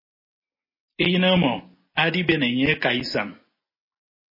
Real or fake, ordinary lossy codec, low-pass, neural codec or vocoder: real; MP3, 24 kbps; 5.4 kHz; none